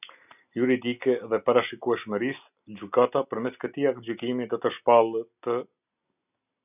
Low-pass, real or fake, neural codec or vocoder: 3.6 kHz; real; none